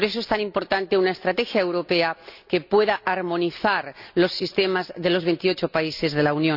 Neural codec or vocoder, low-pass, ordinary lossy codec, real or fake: none; 5.4 kHz; none; real